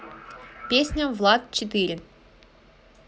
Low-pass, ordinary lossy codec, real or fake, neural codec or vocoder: none; none; real; none